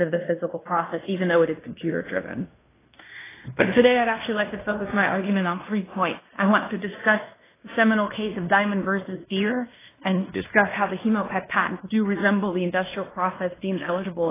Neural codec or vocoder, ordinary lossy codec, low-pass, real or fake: codec, 16 kHz in and 24 kHz out, 0.9 kbps, LongCat-Audio-Codec, fine tuned four codebook decoder; AAC, 16 kbps; 3.6 kHz; fake